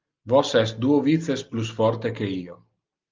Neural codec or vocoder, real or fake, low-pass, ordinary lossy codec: none; real; 7.2 kHz; Opus, 24 kbps